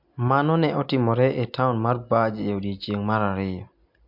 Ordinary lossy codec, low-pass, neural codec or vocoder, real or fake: MP3, 48 kbps; 5.4 kHz; none; real